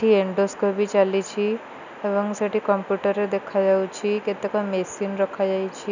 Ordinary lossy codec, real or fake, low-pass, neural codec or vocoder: none; real; 7.2 kHz; none